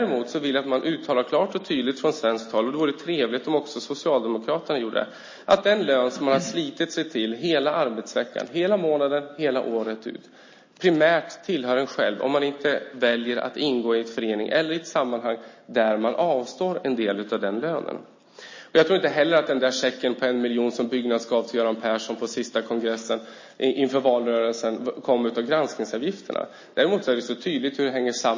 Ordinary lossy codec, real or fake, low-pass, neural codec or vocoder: MP3, 32 kbps; real; 7.2 kHz; none